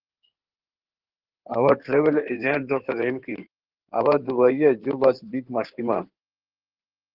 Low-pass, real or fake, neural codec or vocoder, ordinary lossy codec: 5.4 kHz; fake; codec, 16 kHz in and 24 kHz out, 2.2 kbps, FireRedTTS-2 codec; Opus, 16 kbps